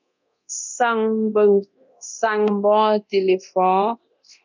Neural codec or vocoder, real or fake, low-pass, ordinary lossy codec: codec, 24 kHz, 0.9 kbps, DualCodec; fake; 7.2 kHz; MP3, 64 kbps